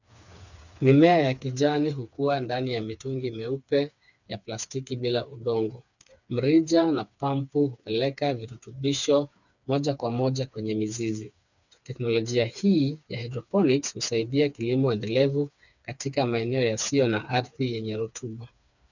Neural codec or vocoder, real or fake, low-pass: codec, 16 kHz, 4 kbps, FreqCodec, smaller model; fake; 7.2 kHz